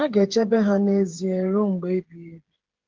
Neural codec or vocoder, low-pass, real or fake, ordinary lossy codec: codec, 16 kHz, 8 kbps, FreqCodec, smaller model; 7.2 kHz; fake; Opus, 16 kbps